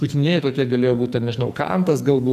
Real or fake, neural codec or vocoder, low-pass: fake; codec, 44.1 kHz, 2.6 kbps, SNAC; 14.4 kHz